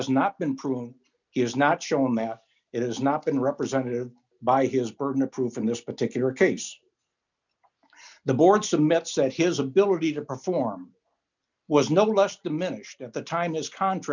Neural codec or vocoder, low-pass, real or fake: none; 7.2 kHz; real